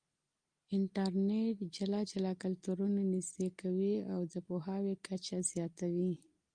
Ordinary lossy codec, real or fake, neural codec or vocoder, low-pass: Opus, 32 kbps; real; none; 9.9 kHz